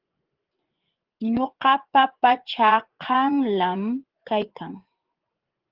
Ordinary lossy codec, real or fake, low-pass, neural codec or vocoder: Opus, 16 kbps; fake; 5.4 kHz; vocoder, 24 kHz, 100 mel bands, Vocos